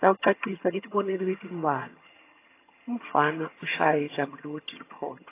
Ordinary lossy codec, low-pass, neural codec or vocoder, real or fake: AAC, 24 kbps; 3.6 kHz; vocoder, 22.05 kHz, 80 mel bands, HiFi-GAN; fake